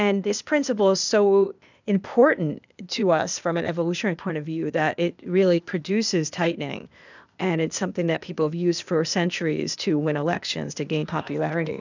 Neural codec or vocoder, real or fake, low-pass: codec, 16 kHz, 0.8 kbps, ZipCodec; fake; 7.2 kHz